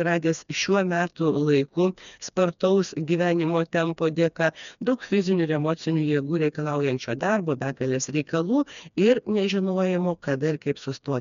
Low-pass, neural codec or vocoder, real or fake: 7.2 kHz; codec, 16 kHz, 2 kbps, FreqCodec, smaller model; fake